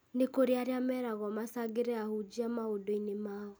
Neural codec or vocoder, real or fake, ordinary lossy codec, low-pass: none; real; none; none